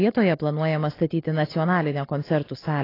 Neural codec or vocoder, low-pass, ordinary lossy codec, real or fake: none; 5.4 kHz; AAC, 24 kbps; real